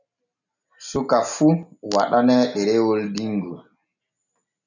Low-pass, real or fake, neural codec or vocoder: 7.2 kHz; real; none